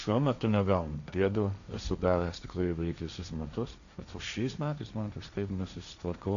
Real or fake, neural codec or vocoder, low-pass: fake; codec, 16 kHz, 1.1 kbps, Voila-Tokenizer; 7.2 kHz